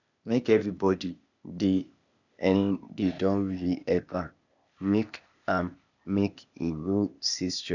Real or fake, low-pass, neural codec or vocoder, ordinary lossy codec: fake; 7.2 kHz; codec, 16 kHz, 0.8 kbps, ZipCodec; none